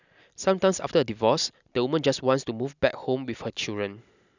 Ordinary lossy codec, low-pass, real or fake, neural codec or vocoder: none; 7.2 kHz; real; none